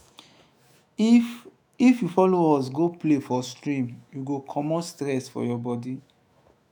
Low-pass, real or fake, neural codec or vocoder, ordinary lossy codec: none; fake; autoencoder, 48 kHz, 128 numbers a frame, DAC-VAE, trained on Japanese speech; none